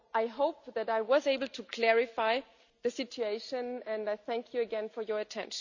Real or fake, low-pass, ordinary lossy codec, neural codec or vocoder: real; 7.2 kHz; none; none